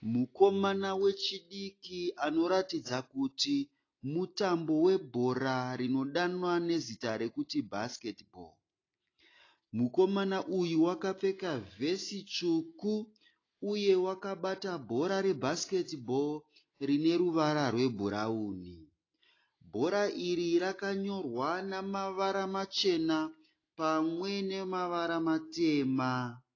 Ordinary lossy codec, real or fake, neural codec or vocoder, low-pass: AAC, 32 kbps; real; none; 7.2 kHz